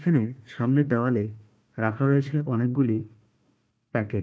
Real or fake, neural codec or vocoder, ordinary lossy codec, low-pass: fake; codec, 16 kHz, 1 kbps, FunCodec, trained on Chinese and English, 50 frames a second; none; none